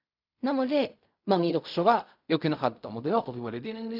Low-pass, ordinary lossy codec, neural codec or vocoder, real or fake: 5.4 kHz; none; codec, 16 kHz in and 24 kHz out, 0.4 kbps, LongCat-Audio-Codec, fine tuned four codebook decoder; fake